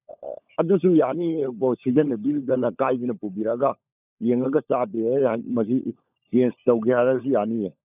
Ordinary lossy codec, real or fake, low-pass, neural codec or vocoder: none; fake; 3.6 kHz; codec, 16 kHz, 16 kbps, FunCodec, trained on LibriTTS, 50 frames a second